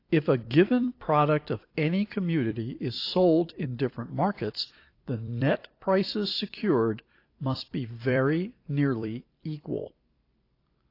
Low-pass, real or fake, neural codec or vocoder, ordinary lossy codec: 5.4 kHz; fake; vocoder, 44.1 kHz, 128 mel bands every 256 samples, BigVGAN v2; AAC, 32 kbps